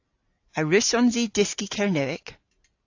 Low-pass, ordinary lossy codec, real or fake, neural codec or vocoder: 7.2 kHz; AAC, 48 kbps; real; none